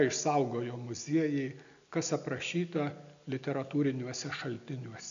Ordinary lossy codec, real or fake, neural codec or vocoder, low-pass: AAC, 96 kbps; real; none; 7.2 kHz